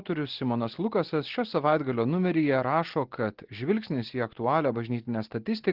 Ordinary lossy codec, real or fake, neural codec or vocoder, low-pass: Opus, 16 kbps; real; none; 5.4 kHz